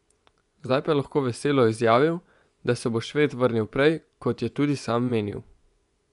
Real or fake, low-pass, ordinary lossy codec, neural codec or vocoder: fake; 10.8 kHz; none; vocoder, 24 kHz, 100 mel bands, Vocos